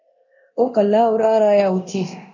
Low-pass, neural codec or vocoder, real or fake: 7.2 kHz; codec, 24 kHz, 0.9 kbps, DualCodec; fake